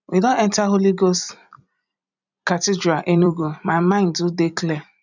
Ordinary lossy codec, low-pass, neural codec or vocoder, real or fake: none; 7.2 kHz; vocoder, 44.1 kHz, 128 mel bands every 256 samples, BigVGAN v2; fake